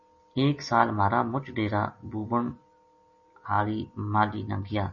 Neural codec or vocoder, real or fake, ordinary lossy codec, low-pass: none; real; MP3, 32 kbps; 7.2 kHz